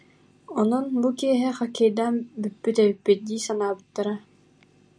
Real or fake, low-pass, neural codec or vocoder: real; 9.9 kHz; none